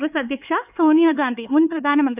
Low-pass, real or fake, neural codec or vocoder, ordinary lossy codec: 3.6 kHz; fake; codec, 16 kHz, 4 kbps, X-Codec, HuBERT features, trained on LibriSpeech; none